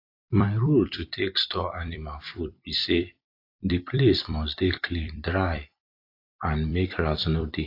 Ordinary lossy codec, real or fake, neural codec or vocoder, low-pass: AAC, 32 kbps; fake; vocoder, 44.1 kHz, 128 mel bands every 256 samples, BigVGAN v2; 5.4 kHz